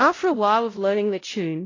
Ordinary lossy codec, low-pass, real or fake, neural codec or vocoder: AAC, 32 kbps; 7.2 kHz; fake; codec, 16 kHz, 0.5 kbps, X-Codec, WavLM features, trained on Multilingual LibriSpeech